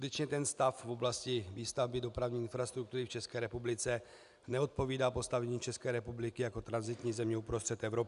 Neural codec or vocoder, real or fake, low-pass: none; real; 10.8 kHz